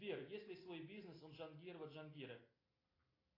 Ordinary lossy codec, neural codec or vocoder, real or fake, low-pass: AAC, 24 kbps; none; real; 5.4 kHz